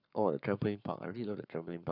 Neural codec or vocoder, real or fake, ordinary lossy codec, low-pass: codec, 44.1 kHz, 7.8 kbps, Pupu-Codec; fake; none; 5.4 kHz